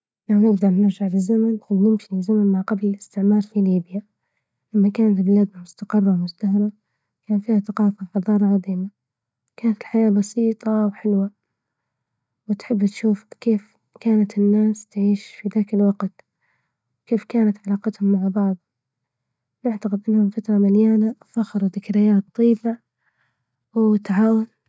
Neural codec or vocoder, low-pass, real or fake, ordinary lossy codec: none; none; real; none